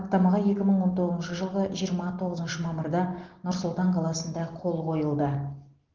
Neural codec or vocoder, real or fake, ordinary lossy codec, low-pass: none; real; Opus, 16 kbps; 7.2 kHz